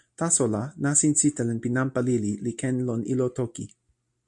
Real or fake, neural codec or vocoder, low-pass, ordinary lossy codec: real; none; 10.8 kHz; MP3, 64 kbps